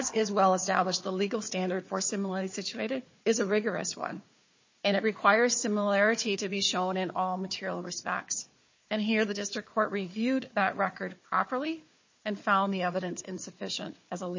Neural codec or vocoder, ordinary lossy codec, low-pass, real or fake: codec, 16 kHz, 4 kbps, FunCodec, trained on Chinese and English, 50 frames a second; MP3, 32 kbps; 7.2 kHz; fake